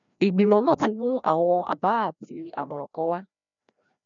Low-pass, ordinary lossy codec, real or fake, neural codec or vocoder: 7.2 kHz; none; fake; codec, 16 kHz, 1 kbps, FreqCodec, larger model